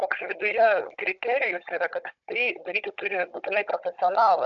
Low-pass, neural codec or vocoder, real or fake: 7.2 kHz; codec, 16 kHz, 16 kbps, FunCodec, trained on Chinese and English, 50 frames a second; fake